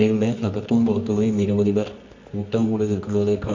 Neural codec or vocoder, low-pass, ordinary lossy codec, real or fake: codec, 24 kHz, 0.9 kbps, WavTokenizer, medium music audio release; 7.2 kHz; MP3, 64 kbps; fake